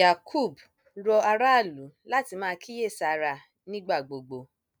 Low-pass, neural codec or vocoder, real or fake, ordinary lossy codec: none; none; real; none